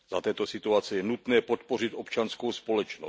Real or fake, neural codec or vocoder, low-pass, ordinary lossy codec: real; none; none; none